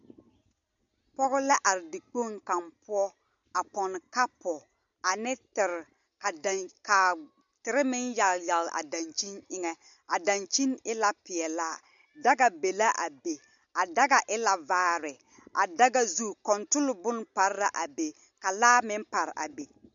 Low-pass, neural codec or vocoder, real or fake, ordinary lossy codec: 7.2 kHz; none; real; MP3, 96 kbps